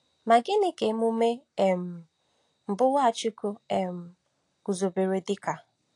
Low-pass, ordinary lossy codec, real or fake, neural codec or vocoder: 10.8 kHz; AAC, 48 kbps; real; none